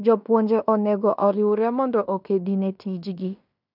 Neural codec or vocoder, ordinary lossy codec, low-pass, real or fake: codec, 16 kHz in and 24 kHz out, 0.9 kbps, LongCat-Audio-Codec, fine tuned four codebook decoder; none; 5.4 kHz; fake